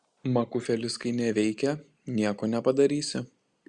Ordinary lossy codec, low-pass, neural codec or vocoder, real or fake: Opus, 64 kbps; 9.9 kHz; none; real